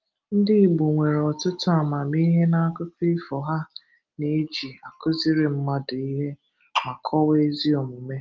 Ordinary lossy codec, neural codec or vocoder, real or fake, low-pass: Opus, 24 kbps; none; real; 7.2 kHz